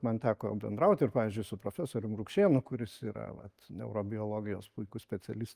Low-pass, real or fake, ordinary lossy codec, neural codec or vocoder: 14.4 kHz; real; Opus, 32 kbps; none